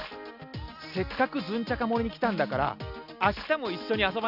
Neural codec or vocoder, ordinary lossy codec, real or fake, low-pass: none; none; real; 5.4 kHz